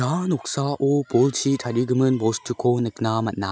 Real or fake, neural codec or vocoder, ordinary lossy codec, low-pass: real; none; none; none